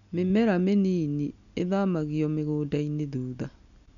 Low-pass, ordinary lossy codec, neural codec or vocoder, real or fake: 7.2 kHz; none; none; real